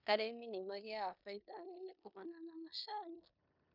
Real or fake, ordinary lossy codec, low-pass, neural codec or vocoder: fake; none; 5.4 kHz; codec, 16 kHz in and 24 kHz out, 0.9 kbps, LongCat-Audio-Codec, four codebook decoder